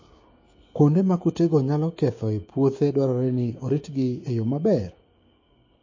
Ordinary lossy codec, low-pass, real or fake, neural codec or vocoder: MP3, 32 kbps; 7.2 kHz; fake; codec, 16 kHz, 6 kbps, DAC